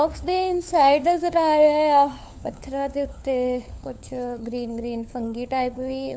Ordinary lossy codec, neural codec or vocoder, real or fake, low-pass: none; codec, 16 kHz, 4 kbps, FunCodec, trained on LibriTTS, 50 frames a second; fake; none